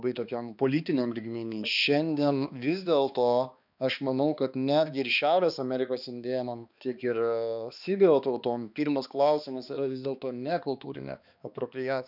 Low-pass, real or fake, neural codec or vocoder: 5.4 kHz; fake; codec, 16 kHz, 2 kbps, X-Codec, HuBERT features, trained on balanced general audio